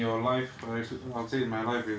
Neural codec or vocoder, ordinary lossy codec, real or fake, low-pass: none; none; real; none